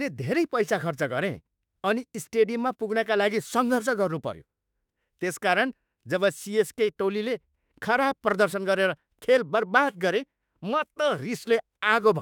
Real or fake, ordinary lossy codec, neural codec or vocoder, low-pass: fake; none; autoencoder, 48 kHz, 32 numbers a frame, DAC-VAE, trained on Japanese speech; 19.8 kHz